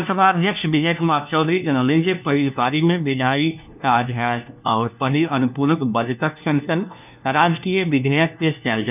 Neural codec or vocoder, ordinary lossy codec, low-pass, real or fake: codec, 16 kHz, 1 kbps, FunCodec, trained on LibriTTS, 50 frames a second; none; 3.6 kHz; fake